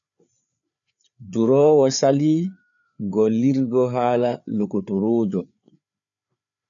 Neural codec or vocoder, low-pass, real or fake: codec, 16 kHz, 4 kbps, FreqCodec, larger model; 7.2 kHz; fake